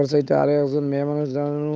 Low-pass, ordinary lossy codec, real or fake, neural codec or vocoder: none; none; fake; codec, 16 kHz, 8 kbps, FunCodec, trained on Chinese and English, 25 frames a second